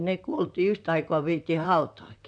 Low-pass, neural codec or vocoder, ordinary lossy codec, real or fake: 9.9 kHz; vocoder, 44.1 kHz, 128 mel bands, Pupu-Vocoder; none; fake